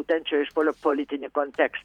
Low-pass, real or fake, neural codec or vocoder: 19.8 kHz; fake; vocoder, 44.1 kHz, 128 mel bands every 512 samples, BigVGAN v2